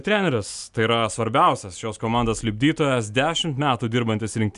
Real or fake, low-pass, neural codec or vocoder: real; 10.8 kHz; none